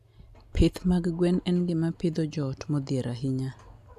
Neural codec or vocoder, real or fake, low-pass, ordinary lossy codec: none; real; 14.4 kHz; none